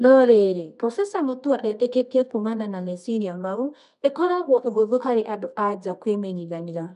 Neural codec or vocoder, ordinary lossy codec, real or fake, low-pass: codec, 24 kHz, 0.9 kbps, WavTokenizer, medium music audio release; none; fake; 10.8 kHz